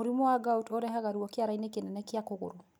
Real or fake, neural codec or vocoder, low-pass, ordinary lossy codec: real; none; none; none